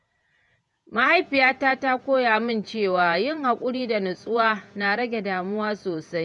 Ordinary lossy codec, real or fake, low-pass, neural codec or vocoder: none; fake; 10.8 kHz; vocoder, 48 kHz, 128 mel bands, Vocos